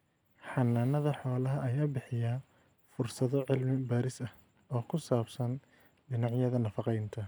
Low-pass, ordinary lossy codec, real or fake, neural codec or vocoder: none; none; real; none